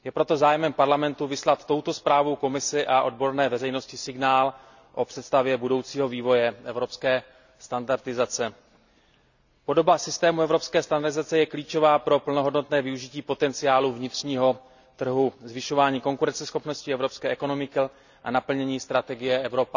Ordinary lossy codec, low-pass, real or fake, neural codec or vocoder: none; 7.2 kHz; real; none